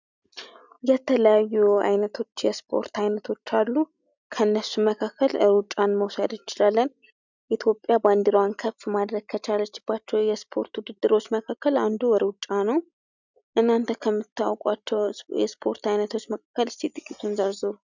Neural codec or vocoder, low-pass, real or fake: none; 7.2 kHz; real